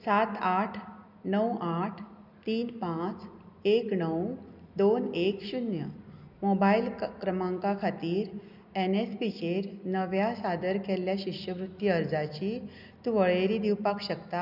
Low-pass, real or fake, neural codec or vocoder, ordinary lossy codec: 5.4 kHz; real; none; none